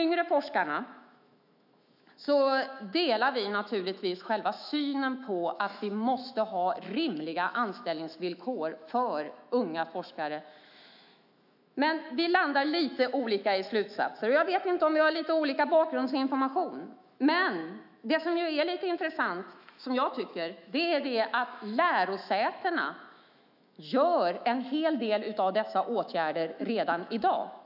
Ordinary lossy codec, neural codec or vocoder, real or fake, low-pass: none; autoencoder, 48 kHz, 128 numbers a frame, DAC-VAE, trained on Japanese speech; fake; 5.4 kHz